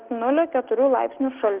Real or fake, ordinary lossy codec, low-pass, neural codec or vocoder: real; Opus, 16 kbps; 3.6 kHz; none